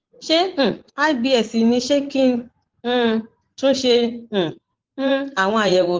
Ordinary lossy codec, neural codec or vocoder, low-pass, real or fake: Opus, 16 kbps; vocoder, 44.1 kHz, 80 mel bands, Vocos; 7.2 kHz; fake